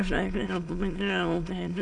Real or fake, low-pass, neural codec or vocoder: fake; 9.9 kHz; autoencoder, 22.05 kHz, a latent of 192 numbers a frame, VITS, trained on many speakers